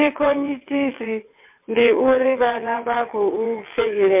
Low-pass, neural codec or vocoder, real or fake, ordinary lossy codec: 3.6 kHz; vocoder, 22.05 kHz, 80 mel bands, WaveNeXt; fake; MP3, 32 kbps